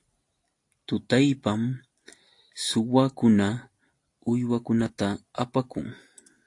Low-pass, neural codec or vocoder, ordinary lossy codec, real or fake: 10.8 kHz; none; AAC, 48 kbps; real